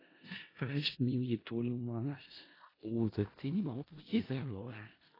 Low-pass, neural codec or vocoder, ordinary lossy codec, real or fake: 5.4 kHz; codec, 16 kHz in and 24 kHz out, 0.4 kbps, LongCat-Audio-Codec, four codebook decoder; AAC, 24 kbps; fake